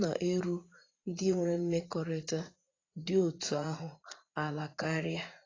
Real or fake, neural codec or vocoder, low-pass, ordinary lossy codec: fake; vocoder, 44.1 kHz, 80 mel bands, Vocos; 7.2 kHz; AAC, 32 kbps